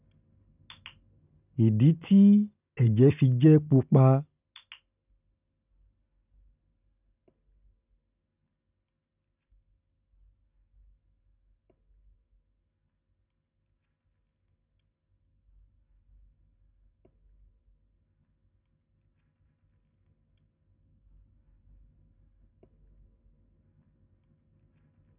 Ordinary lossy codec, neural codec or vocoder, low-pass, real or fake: none; none; 3.6 kHz; real